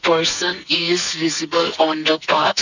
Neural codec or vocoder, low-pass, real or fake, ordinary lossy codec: codec, 44.1 kHz, 2.6 kbps, SNAC; 7.2 kHz; fake; none